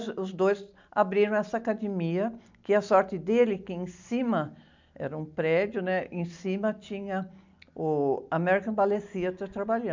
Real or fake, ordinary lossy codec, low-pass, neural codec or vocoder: real; MP3, 64 kbps; 7.2 kHz; none